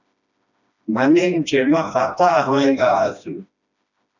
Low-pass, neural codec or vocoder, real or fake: 7.2 kHz; codec, 16 kHz, 1 kbps, FreqCodec, smaller model; fake